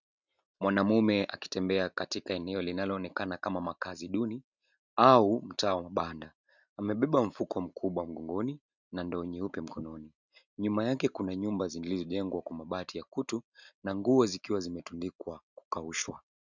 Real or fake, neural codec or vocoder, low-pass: real; none; 7.2 kHz